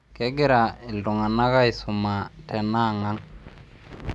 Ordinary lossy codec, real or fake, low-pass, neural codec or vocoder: none; real; none; none